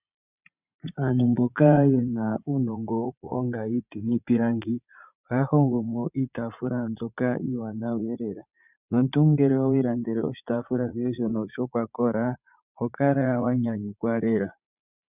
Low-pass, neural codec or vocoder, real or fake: 3.6 kHz; vocoder, 44.1 kHz, 80 mel bands, Vocos; fake